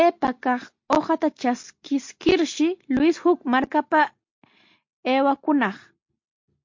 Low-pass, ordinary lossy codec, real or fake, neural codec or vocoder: 7.2 kHz; MP3, 48 kbps; real; none